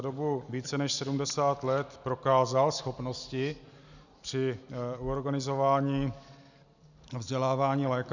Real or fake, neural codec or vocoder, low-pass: real; none; 7.2 kHz